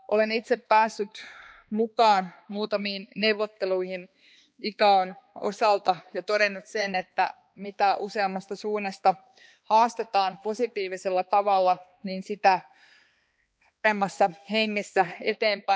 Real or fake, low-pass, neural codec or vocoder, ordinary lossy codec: fake; none; codec, 16 kHz, 2 kbps, X-Codec, HuBERT features, trained on balanced general audio; none